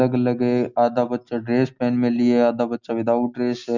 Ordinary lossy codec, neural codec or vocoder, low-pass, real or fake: none; none; 7.2 kHz; real